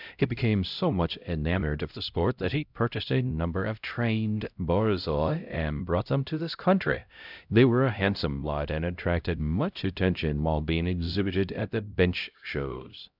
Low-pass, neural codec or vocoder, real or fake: 5.4 kHz; codec, 16 kHz, 0.5 kbps, X-Codec, HuBERT features, trained on LibriSpeech; fake